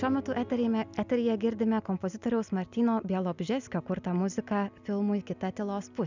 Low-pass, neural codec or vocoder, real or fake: 7.2 kHz; none; real